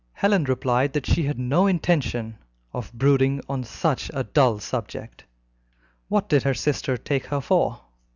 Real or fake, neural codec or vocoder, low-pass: real; none; 7.2 kHz